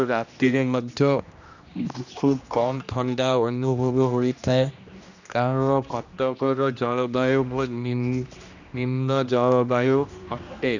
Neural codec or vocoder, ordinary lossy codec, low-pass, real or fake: codec, 16 kHz, 1 kbps, X-Codec, HuBERT features, trained on general audio; none; 7.2 kHz; fake